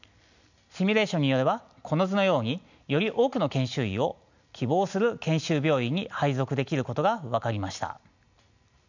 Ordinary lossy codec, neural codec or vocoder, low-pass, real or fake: none; none; 7.2 kHz; real